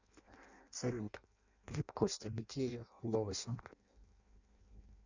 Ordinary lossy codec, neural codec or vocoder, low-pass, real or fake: Opus, 64 kbps; codec, 16 kHz in and 24 kHz out, 0.6 kbps, FireRedTTS-2 codec; 7.2 kHz; fake